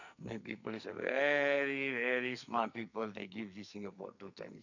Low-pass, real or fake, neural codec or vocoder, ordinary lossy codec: 7.2 kHz; fake; codec, 44.1 kHz, 2.6 kbps, SNAC; none